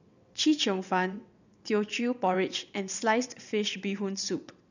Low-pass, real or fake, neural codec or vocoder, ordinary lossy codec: 7.2 kHz; fake; vocoder, 22.05 kHz, 80 mel bands, WaveNeXt; none